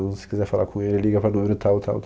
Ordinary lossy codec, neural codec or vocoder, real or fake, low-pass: none; none; real; none